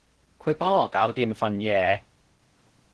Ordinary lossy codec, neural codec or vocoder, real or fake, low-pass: Opus, 16 kbps; codec, 16 kHz in and 24 kHz out, 0.6 kbps, FocalCodec, streaming, 4096 codes; fake; 10.8 kHz